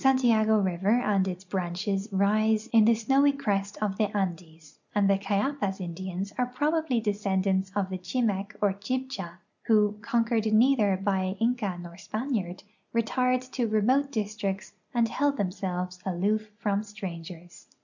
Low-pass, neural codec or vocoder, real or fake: 7.2 kHz; none; real